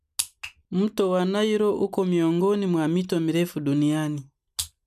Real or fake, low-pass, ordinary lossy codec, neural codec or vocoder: real; 14.4 kHz; none; none